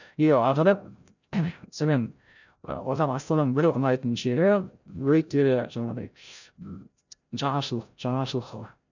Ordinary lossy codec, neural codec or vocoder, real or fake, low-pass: none; codec, 16 kHz, 0.5 kbps, FreqCodec, larger model; fake; 7.2 kHz